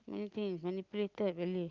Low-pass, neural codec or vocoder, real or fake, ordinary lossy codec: 7.2 kHz; none; real; Opus, 24 kbps